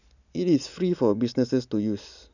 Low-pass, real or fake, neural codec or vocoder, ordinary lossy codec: 7.2 kHz; real; none; none